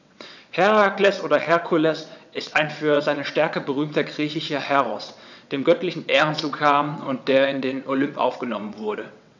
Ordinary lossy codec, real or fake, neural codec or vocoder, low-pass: none; fake; vocoder, 22.05 kHz, 80 mel bands, WaveNeXt; 7.2 kHz